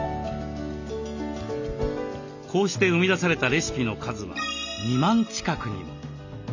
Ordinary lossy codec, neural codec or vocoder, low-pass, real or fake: none; none; 7.2 kHz; real